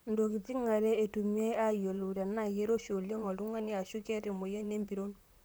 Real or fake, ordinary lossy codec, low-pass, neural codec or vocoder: fake; none; none; vocoder, 44.1 kHz, 128 mel bands, Pupu-Vocoder